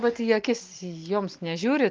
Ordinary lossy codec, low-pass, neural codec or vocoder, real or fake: Opus, 24 kbps; 7.2 kHz; none; real